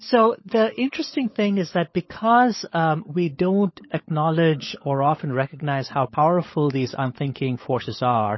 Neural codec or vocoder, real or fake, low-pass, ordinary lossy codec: codec, 16 kHz, 16 kbps, FunCodec, trained on LibriTTS, 50 frames a second; fake; 7.2 kHz; MP3, 24 kbps